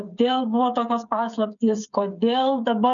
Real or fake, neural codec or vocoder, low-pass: fake; codec, 16 kHz, 8 kbps, FreqCodec, smaller model; 7.2 kHz